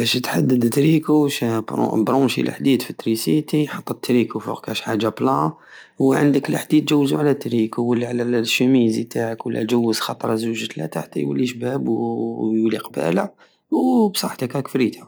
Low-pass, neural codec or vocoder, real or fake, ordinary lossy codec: none; none; real; none